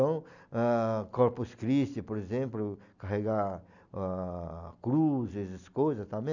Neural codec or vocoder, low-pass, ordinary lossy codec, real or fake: none; 7.2 kHz; none; real